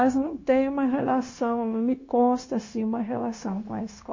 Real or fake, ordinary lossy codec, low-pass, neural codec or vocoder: fake; MP3, 32 kbps; 7.2 kHz; codec, 16 kHz, 0.9 kbps, LongCat-Audio-Codec